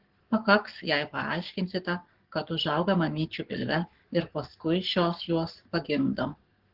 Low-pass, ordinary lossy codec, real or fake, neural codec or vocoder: 5.4 kHz; Opus, 16 kbps; fake; codec, 44.1 kHz, 7.8 kbps, Pupu-Codec